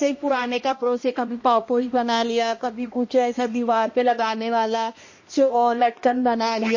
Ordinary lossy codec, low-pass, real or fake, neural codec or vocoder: MP3, 32 kbps; 7.2 kHz; fake; codec, 16 kHz, 1 kbps, X-Codec, HuBERT features, trained on balanced general audio